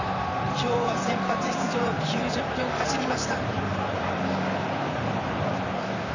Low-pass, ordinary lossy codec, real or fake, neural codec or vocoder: 7.2 kHz; none; fake; vocoder, 44.1 kHz, 128 mel bands, Pupu-Vocoder